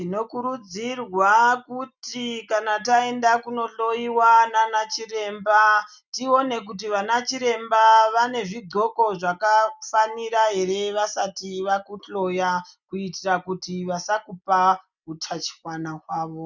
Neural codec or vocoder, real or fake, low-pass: none; real; 7.2 kHz